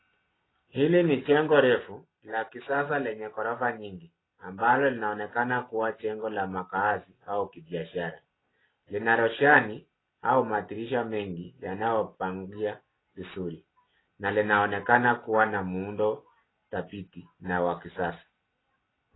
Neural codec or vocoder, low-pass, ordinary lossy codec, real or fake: none; 7.2 kHz; AAC, 16 kbps; real